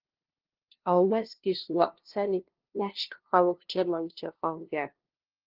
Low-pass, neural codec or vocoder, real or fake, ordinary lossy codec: 5.4 kHz; codec, 16 kHz, 0.5 kbps, FunCodec, trained on LibriTTS, 25 frames a second; fake; Opus, 16 kbps